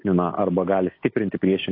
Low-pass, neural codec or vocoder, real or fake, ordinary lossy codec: 5.4 kHz; none; real; AAC, 32 kbps